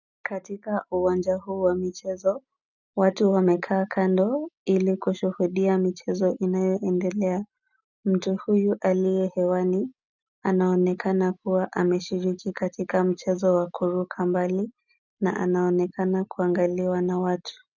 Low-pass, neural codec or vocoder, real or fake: 7.2 kHz; none; real